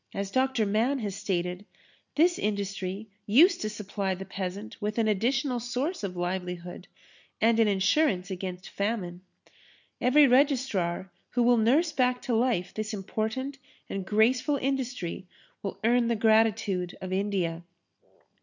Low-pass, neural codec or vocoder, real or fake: 7.2 kHz; none; real